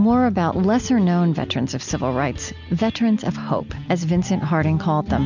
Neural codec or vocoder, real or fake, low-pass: none; real; 7.2 kHz